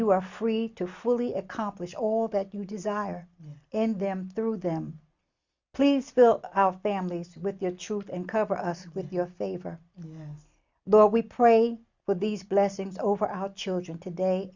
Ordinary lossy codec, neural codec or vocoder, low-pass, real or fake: Opus, 64 kbps; none; 7.2 kHz; real